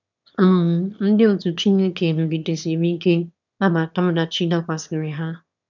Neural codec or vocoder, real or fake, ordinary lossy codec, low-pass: autoencoder, 22.05 kHz, a latent of 192 numbers a frame, VITS, trained on one speaker; fake; none; 7.2 kHz